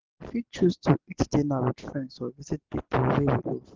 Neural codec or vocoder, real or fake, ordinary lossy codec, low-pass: none; real; Opus, 16 kbps; 7.2 kHz